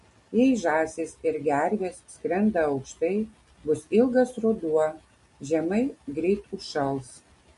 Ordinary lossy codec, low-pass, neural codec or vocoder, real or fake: MP3, 48 kbps; 14.4 kHz; none; real